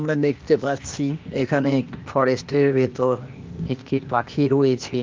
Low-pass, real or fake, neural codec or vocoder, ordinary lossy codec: 7.2 kHz; fake; codec, 16 kHz, 0.8 kbps, ZipCodec; Opus, 24 kbps